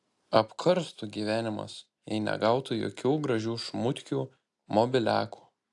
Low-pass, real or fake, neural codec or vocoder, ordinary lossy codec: 10.8 kHz; real; none; AAC, 64 kbps